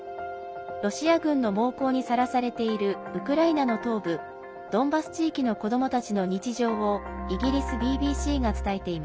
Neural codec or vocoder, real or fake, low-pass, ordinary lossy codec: none; real; none; none